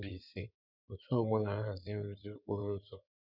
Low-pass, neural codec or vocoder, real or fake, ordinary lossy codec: 5.4 kHz; codec, 16 kHz in and 24 kHz out, 2.2 kbps, FireRedTTS-2 codec; fake; none